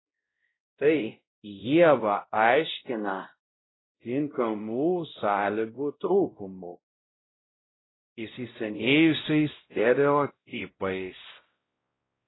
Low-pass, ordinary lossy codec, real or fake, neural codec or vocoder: 7.2 kHz; AAC, 16 kbps; fake; codec, 16 kHz, 0.5 kbps, X-Codec, WavLM features, trained on Multilingual LibriSpeech